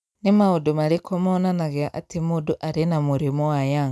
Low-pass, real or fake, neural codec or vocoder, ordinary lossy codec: none; real; none; none